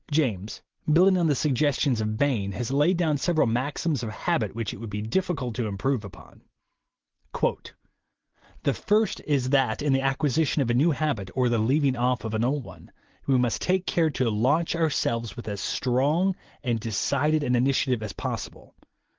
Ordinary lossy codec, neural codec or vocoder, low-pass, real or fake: Opus, 16 kbps; none; 7.2 kHz; real